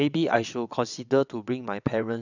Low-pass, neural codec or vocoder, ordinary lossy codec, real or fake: 7.2 kHz; vocoder, 22.05 kHz, 80 mel bands, WaveNeXt; none; fake